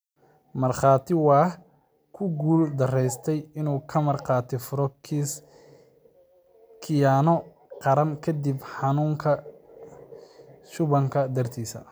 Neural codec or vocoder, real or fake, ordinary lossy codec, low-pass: none; real; none; none